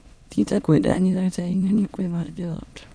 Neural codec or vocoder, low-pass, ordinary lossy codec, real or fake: autoencoder, 22.05 kHz, a latent of 192 numbers a frame, VITS, trained on many speakers; none; none; fake